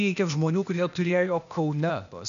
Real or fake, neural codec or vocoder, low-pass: fake; codec, 16 kHz, 0.8 kbps, ZipCodec; 7.2 kHz